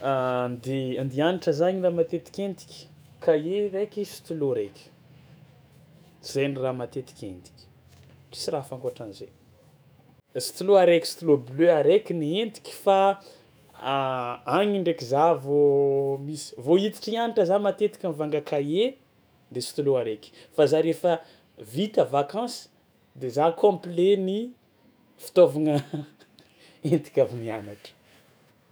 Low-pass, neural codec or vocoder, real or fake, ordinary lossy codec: none; autoencoder, 48 kHz, 128 numbers a frame, DAC-VAE, trained on Japanese speech; fake; none